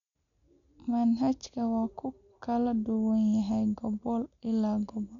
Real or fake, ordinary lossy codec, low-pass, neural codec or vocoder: real; MP3, 96 kbps; 7.2 kHz; none